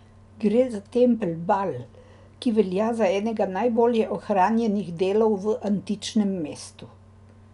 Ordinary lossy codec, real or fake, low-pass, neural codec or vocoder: none; real; 10.8 kHz; none